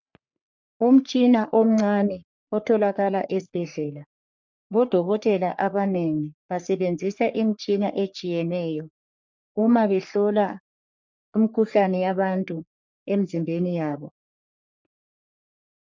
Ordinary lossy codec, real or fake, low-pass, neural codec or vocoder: MP3, 64 kbps; fake; 7.2 kHz; codec, 44.1 kHz, 3.4 kbps, Pupu-Codec